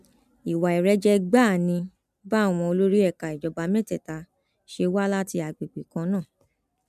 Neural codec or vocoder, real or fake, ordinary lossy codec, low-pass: none; real; none; 14.4 kHz